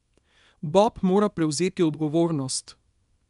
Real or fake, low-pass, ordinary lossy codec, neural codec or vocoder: fake; 10.8 kHz; none; codec, 24 kHz, 0.9 kbps, WavTokenizer, small release